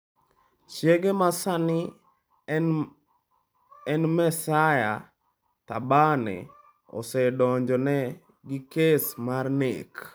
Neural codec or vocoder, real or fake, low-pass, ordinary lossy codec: vocoder, 44.1 kHz, 128 mel bands every 256 samples, BigVGAN v2; fake; none; none